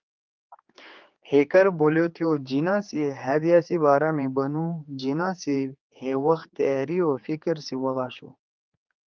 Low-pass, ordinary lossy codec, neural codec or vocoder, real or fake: 7.2 kHz; Opus, 24 kbps; codec, 16 kHz, 4 kbps, X-Codec, HuBERT features, trained on general audio; fake